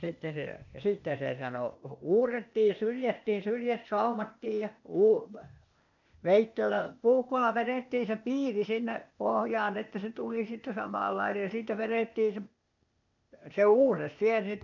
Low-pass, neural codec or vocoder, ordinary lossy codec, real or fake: 7.2 kHz; codec, 16 kHz, 0.8 kbps, ZipCodec; none; fake